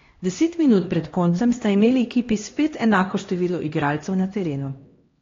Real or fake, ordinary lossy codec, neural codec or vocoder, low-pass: fake; AAC, 32 kbps; codec, 16 kHz, 1 kbps, X-Codec, HuBERT features, trained on LibriSpeech; 7.2 kHz